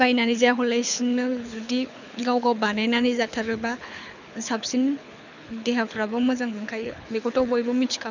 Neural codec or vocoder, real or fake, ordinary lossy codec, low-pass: codec, 24 kHz, 6 kbps, HILCodec; fake; none; 7.2 kHz